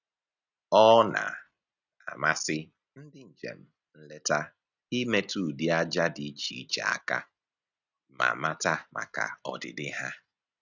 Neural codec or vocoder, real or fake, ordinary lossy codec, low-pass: none; real; none; 7.2 kHz